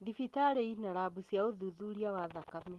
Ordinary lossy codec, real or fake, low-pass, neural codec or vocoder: Opus, 24 kbps; real; 19.8 kHz; none